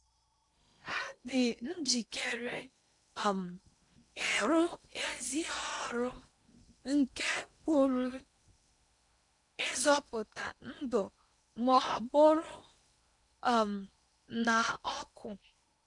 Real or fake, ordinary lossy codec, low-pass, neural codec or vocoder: fake; none; 10.8 kHz; codec, 16 kHz in and 24 kHz out, 0.8 kbps, FocalCodec, streaming, 65536 codes